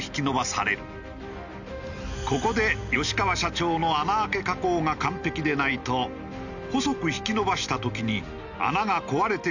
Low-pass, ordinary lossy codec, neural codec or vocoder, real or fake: 7.2 kHz; none; none; real